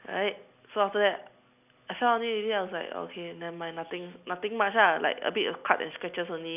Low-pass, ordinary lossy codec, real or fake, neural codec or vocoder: 3.6 kHz; none; real; none